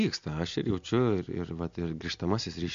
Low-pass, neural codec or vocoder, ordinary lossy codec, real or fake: 7.2 kHz; none; MP3, 48 kbps; real